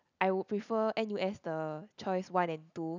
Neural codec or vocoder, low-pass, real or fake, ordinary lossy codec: none; 7.2 kHz; real; none